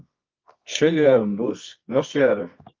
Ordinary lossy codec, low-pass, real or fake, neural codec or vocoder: Opus, 32 kbps; 7.2 kHz; fake; codec, 24 kHz, 0.9 kbps, WavTokenizer, medium music audio release